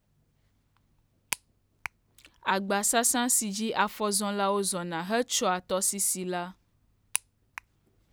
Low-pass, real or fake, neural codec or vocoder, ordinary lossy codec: none; real; none; none